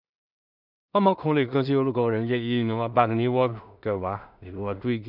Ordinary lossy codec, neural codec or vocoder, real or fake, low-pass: none; codec, 16 kHz in and 24 kHz out, 0.4 kbps, LongCat-Audio-Codec, two codebook decoder; fake; 5.4 kHz